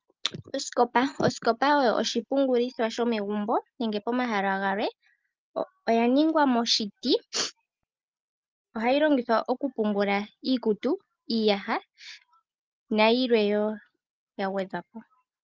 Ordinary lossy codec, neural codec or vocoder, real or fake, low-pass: Opus, 24 kbps; none; real; 7.2 kHz